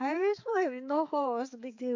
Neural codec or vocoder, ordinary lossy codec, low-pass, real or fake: codec, 16 kHz, 4 kbps, X-Codec, HuBERT features, trained on balanced general audio; AAC, 48 kbps; 7.2 kHz; fake